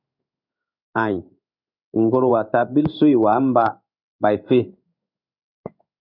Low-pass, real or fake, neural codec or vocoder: 5.4 kHz; fake; codec, 16 kHz in and 24 kHz out, 1 kbps, XY-Tokenizer